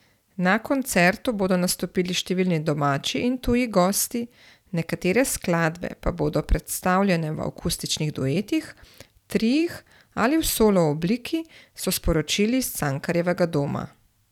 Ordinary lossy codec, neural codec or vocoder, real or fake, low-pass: none; vocoder, 44.1 kHz, 128 mel bands every 256 samples, BigVGAN v2; fake; 19.8 kHz